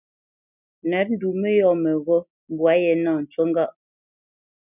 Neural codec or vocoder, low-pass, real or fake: none; 3.6 kHz; real